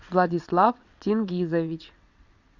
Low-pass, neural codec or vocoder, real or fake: 7.2 kHz; vocoder, 22.05 kHz, 80 mel bands, WaveNeXt; fake